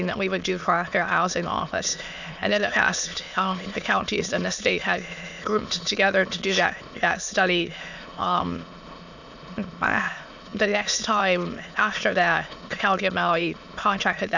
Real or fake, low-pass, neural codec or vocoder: fake; 7.2 kHz; autoencoder, 22.05 kHz, a latent of 192 numbers a frame, VITS, trained on many speakers